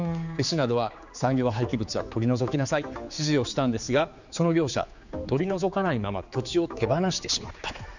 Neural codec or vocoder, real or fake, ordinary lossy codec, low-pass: codec, 16 kHz, 4 kbps, X-Codec, HuBERT features, trained on general audio; fake; none; 7.2 kHz